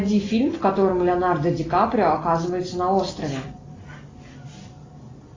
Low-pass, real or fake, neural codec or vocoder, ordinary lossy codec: 7.2 kHz; real; none; AAC, 32 kbps